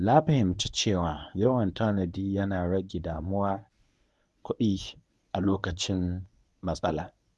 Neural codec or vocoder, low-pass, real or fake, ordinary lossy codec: codec, 24 kHz, 0.9 kbps, WavTokenizer, medium speech release version 1; none; fake; none